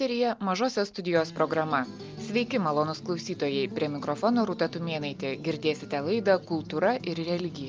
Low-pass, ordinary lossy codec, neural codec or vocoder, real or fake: 7.2 kHz; Opus, 24 kbps; none; real